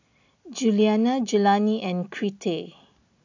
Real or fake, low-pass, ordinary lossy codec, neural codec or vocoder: real; 7.2 kHz; AAC, 48 kbps; none